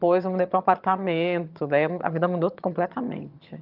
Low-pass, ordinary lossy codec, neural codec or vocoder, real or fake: 5.4 kHz; Opus, 24 kbps; vocoder, 22.05 kHz, 80 mel bands, HiFi-GAN; fake